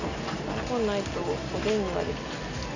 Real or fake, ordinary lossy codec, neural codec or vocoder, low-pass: real; none; none; 7.2 kHz